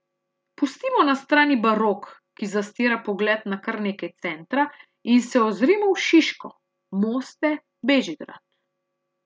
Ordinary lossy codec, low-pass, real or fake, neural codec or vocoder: none; none; real; none